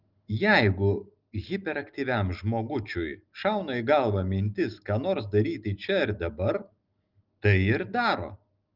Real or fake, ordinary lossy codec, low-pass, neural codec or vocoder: real; Opus, 32 kbps; 5.4 kHz; none